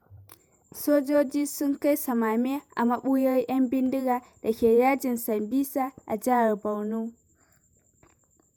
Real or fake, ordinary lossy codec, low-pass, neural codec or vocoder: fake; none; none; vocoder, 48 kHz, 128 mel bands, Vocos